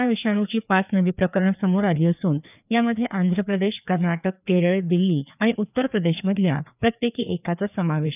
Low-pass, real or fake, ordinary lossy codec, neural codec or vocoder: 3.6 kHz; fake; none; codec, 16 kHz, 2 kbps, FreqCodec, larger model